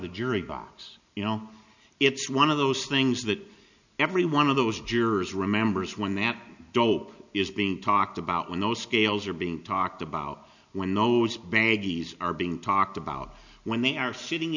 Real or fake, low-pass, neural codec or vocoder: real; 7.2 kHz; none